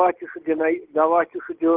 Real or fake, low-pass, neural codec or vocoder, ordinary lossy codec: real; 3.6 kHz; none; Opus, 16 kbps